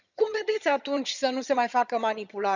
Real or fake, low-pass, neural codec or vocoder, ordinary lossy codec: fake; 7.2 kHz; vocoder, 22.05 kHz, 80 mel bands, HiFi-GAN; none